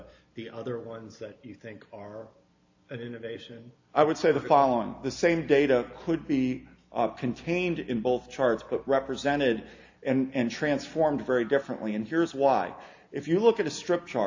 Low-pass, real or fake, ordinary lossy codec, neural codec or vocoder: 7.2 kHz; real; AAC, 48 kbps; none